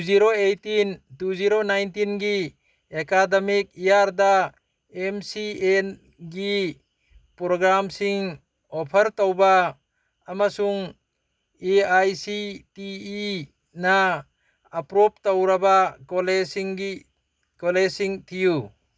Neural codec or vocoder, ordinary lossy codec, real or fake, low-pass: none; none; real; none